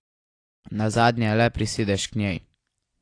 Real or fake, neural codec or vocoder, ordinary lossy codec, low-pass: real; none; AAC, 48 kbps; 9.9 kHz